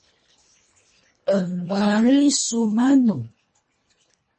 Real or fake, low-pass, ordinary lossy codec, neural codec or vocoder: fake; 10.8 kHz; MP3, 32 kbps; codec, 24 kHz, 1.5 kbps, HILCodec